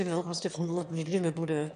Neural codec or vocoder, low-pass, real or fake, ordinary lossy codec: autoencoder, 22.05 kHz, a latent of 192 numbers a frame, VITS, trained on one speaker; 9.9 kHz; fake; AAC, 96 kbps